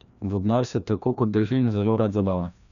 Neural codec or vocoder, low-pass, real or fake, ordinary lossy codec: codec, 16 kHz, 1 kbps, FreqCodec, larger model; 7.2 kHz; fake; none